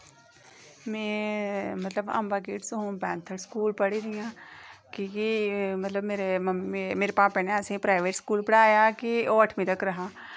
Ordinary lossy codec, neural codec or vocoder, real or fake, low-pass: none; none; real; none